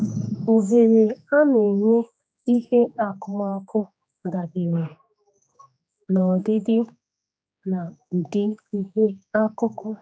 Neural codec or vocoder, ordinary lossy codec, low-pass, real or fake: codec, 16 kHz, 2 kbps, X-Codec, HuBERT features, trained on general audio; none; none; fake